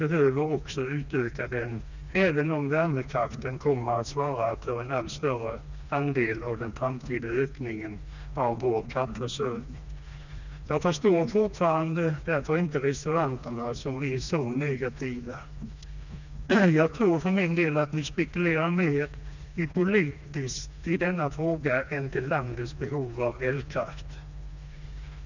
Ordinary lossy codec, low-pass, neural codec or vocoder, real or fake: none; 7.2 kHz; codec, 16 kHz, 2 kbps, FreqCodec, smaller model; fake